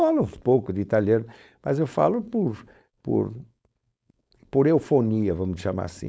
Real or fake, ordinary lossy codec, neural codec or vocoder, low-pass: fake; none; codec, 16 kHz, 4.8 kbps, FACodec; none